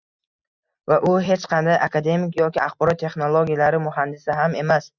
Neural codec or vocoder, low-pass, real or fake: none; 7.2 kHz; real